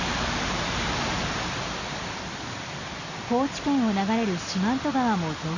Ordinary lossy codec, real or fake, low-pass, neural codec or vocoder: none; real; 7.2 kHz; none